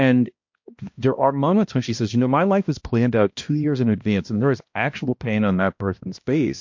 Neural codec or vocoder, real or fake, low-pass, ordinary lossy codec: codec, 16 kHz, 1 kbps, X-Codec, HuBERT features, trained on balanced general audio; fake; 7.2 kHz; MP3, 48 kbps